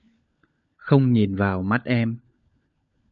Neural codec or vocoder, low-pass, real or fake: codec, 16 kHz, 16 kbps, FunCodec, trained on LibriTTS, 50 frames a second; 7.2 kHz; fake